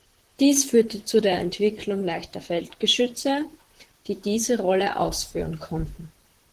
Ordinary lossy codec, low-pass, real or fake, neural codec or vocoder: Opus, 16 kbps; 14.4 kHz; fake; vocoder, 44.1 kHz, 128 mel bands, Pupu-Vocoder